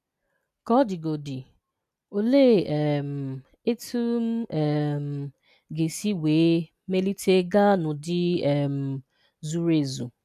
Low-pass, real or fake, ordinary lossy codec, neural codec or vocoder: 14.4 kHz; real; none; none